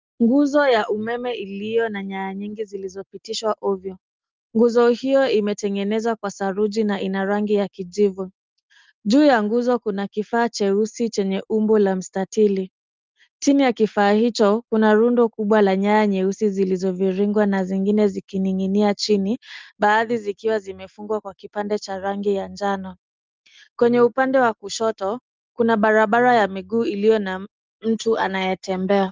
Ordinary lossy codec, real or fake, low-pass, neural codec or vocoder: Opus, 24 kbps; real; 7.2 kHz; none